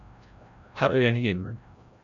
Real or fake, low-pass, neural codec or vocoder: fake; 7.2 kHz; codec, 16 kHz, 0.5 kbps, FreqCodec, larger model